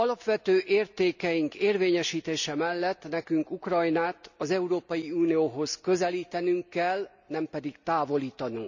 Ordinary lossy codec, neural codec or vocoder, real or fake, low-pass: none; none; real; 7.2 kHz